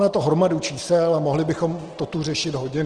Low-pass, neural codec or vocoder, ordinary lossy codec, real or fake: 9.9 kHz; none; Opus, 16 kbps; real